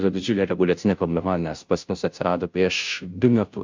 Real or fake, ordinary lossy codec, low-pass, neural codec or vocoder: fake; MP3, 64 kbps; 7.2 kHz; codec, 16 kHz, 0.5 kbps, FunCodec, trained on Chinese and English, 25 frames a second